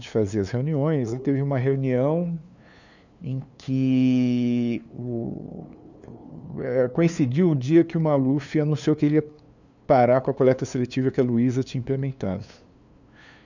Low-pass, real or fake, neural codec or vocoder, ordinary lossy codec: 7.2 kHz; fake; codec, 16 kHz, 2 kbps, FunCodec, trained on LibriTTS, 25 frames a second; none